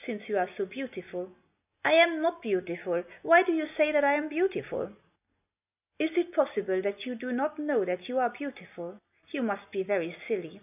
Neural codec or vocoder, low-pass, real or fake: none; 3.6 kHz; real